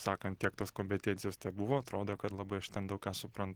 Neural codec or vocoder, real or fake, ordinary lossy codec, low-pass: none; real; Opus, 16 kbps; 19.8 kHz